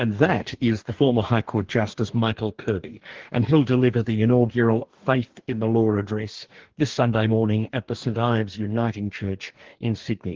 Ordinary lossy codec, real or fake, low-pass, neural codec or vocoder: Opus, 16 kbps; fake; 7.2 kHz; codec, 44.1 kHz, 2.6 kbps, DAC